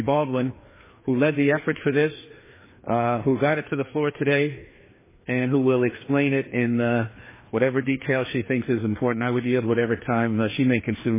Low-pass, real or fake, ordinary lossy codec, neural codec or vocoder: 3.6 kHz; fake; MP3, 16 kbps; codec, 16 kHz, 2 kbps, FreqCodec, larger model